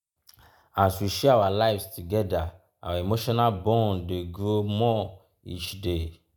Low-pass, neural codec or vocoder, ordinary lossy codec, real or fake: none; none; none; real